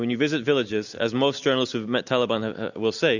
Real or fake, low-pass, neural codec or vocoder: real; 7.2 kHz; none